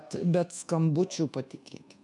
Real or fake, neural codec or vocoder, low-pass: fake; codec, 24 kHz, 1.2 kbps, DualCodec; 10.8 kHz